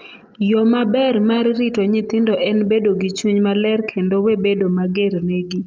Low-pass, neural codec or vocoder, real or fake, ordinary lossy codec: 7.2 kHz; none; real; Opus, 24 kbps